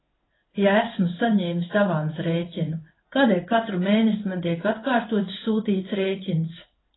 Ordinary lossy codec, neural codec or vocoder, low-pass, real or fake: AAC, 16 kbps; codec, 16 kHz in and 24 kHz out, 1 kbps, XY-Tokenizer; 7.2 kHz; fake